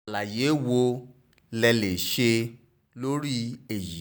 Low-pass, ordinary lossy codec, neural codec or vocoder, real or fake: none; none; none; real